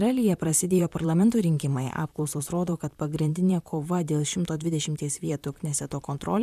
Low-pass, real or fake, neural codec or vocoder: 14.4 kHz; fake; vocoder, 44.1 kHz, 128 mel bands, Pupu-Vocoder